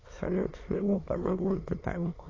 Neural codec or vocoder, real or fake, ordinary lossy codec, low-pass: autoencoder, 22.05 kHz, a latent of 192 numbers a frame, VITS, trained on many speakers; fake; AAC, 32 kbps; 7.2 kHz